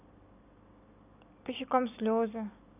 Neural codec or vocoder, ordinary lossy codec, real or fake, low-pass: none; none; real; 3.6 kHz